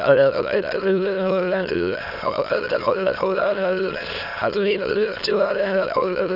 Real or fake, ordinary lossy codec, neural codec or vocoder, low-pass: fake; none; autoencoder, 22.05 kHz, a latent of 192 numbers a frame, VITS, trained on many speakers; 5.4 kHz